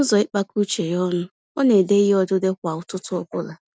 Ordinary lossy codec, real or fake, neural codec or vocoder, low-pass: none; real; none; none